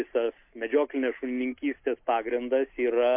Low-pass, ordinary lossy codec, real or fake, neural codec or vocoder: 3.6 kHz; MP3, 32 kbps; real; none